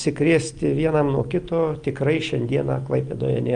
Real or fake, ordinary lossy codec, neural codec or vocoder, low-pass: real; Opus, 32 kbps; none; 9.9 kHz